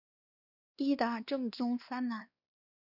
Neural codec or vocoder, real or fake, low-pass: codec, 16 kHz, 2 kbps, X-Codec, WavLM features, trained on Multilingual LibriSpeech; fake; 5.4 kHz